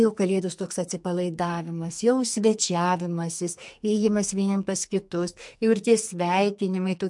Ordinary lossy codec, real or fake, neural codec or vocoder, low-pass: MP3, 64 kbps; fake; codec, 44.1 kHz, 2.6 kbps, SNAC; 10.8 kHz